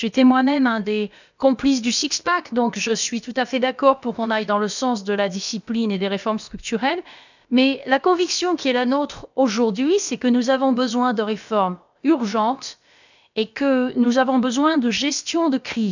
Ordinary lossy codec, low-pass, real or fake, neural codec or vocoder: none; 7.2 kHz; fake; codec, 16 kHz, about 1 kbps, DyCAST, with the encoder's durations